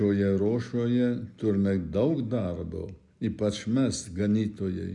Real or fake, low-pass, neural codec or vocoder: real; 10.8 kHz; none